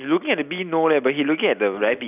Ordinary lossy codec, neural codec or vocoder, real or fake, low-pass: none; none; real; 3.6 kHz